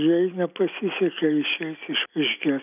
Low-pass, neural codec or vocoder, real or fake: 3.6 kHz; none; real